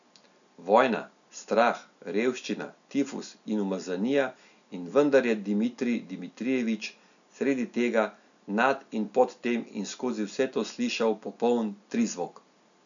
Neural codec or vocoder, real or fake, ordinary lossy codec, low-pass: none; real; none; 7.2 kHz